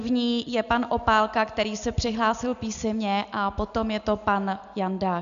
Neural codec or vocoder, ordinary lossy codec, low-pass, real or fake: none; MP3, 96 kbps; 7.2 kHz; real